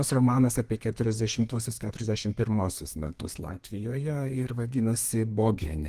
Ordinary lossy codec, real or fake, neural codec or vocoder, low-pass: Opus, 24 kbps; fake; codec, 32 kHz, 1.9 kbps, SNAC; 14.4 kHz